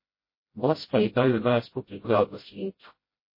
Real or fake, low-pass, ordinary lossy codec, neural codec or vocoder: fake; 5.4 kHz; MP3, 24 kbps; codec, 16 kHz, 0.5 kbps, FreqCodec, smaller model